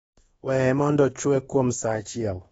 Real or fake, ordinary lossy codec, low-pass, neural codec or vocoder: fake; AAC, 24 kbps; 10.8 kHz; codec, 24 kHz, 1.2 kbps, DualCodec